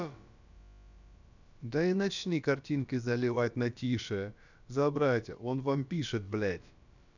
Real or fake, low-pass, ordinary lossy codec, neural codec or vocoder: fake; 7.2 kHz; none; codec, 16 kHz, about 1 kbps, DyCAST, with the encoder's durations